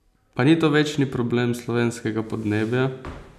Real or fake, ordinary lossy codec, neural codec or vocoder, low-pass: real; none; none; 14.4 kHz